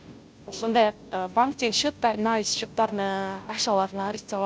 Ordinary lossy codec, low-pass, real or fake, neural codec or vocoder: none; none; fake; codec, 16 kHz, 0.5 kbps, FunCodec, trained on Chinese and English, 25 frames a second